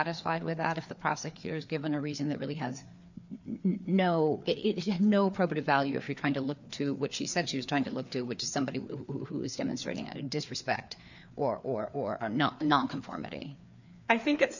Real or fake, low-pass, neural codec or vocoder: fake; 7.2 kHz; codec, 16 kHz, 4 kbps, FreqCodec, larger model